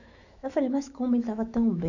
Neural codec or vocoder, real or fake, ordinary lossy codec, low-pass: autoencoder, 48 kHz, 128 numbers a frame, DAC-VAE, trained on Japanese speech; fake; none; 7.2 kHz